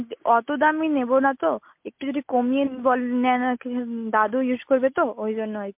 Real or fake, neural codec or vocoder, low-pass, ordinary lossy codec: real; none; 3.6 kHz; MP3, 32 kbps